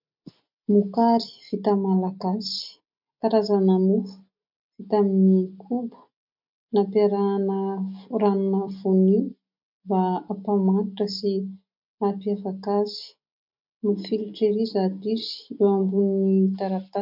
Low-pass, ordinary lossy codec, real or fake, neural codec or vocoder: 5.4 kHz; MP3, 48 kbps; real; none